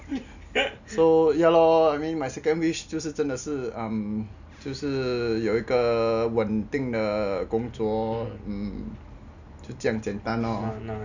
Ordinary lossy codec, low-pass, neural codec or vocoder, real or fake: none; 7.2 kHz; none; real